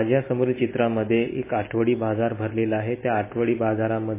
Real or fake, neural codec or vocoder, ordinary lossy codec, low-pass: real; none; MP3, 16 kbps; 3.6 kHz